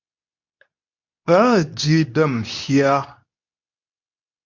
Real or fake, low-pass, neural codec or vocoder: fake; 7.2 kHz; codec, 24 kHz, 0.9 kbps, WavTokenizer, medium speech release version 2